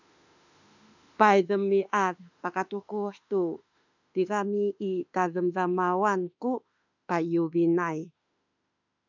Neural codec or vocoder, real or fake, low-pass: autoencoder, 48 kHz, 32 numbers a frame, DAC-VAE, trained on Japanese speech; fake; 7.2 kHz